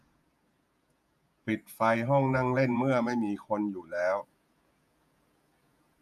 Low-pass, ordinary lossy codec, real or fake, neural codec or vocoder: 14.4 kHz; none; fake; vocoder, 48 kHz, 128 mel bands, Vocos